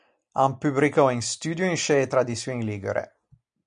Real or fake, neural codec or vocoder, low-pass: real; none; 9.9 kHz